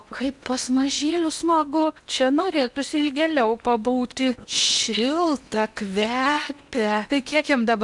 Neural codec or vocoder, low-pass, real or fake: codec, 16 kHz in and 24 kHz out, 0.8 kbps, FocalCodec, streaming, 65536 codes; 10.8 kHz; fake